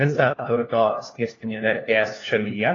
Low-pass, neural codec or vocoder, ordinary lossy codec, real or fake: 7.2 kHz; codec, 16 kHz, 1 kbps, FunCodec, trained on LibriTTS, 50 frames a second; AAC, 32 kbps; fake